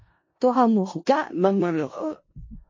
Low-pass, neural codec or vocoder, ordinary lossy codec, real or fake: 7.2 kHz; codec, 16 kHz in and 24 kHz out, 0.4 kbps, LongCat-Audio-Codec, four codebook decoder; MP3, 32 kbps; fake